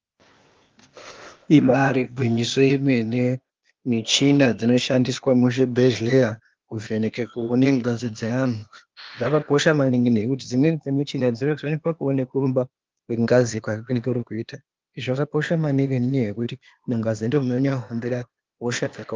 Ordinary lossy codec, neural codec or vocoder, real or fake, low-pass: Opus, 24 kbps; codec, 16 kHz, 0.8 kbps, ZipCodec; fake; 7.2 kHz